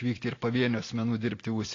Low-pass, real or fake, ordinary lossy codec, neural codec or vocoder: 7.2 kHz; real; AAC, 32 kbps; none